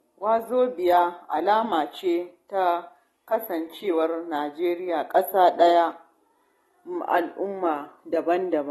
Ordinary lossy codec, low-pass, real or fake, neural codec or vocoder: AAC, 32 kbps; 19.8 kHz; real; none